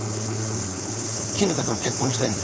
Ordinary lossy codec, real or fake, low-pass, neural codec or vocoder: none; fake; none; codec, 16 kHz, 4.8 kbps, FACodec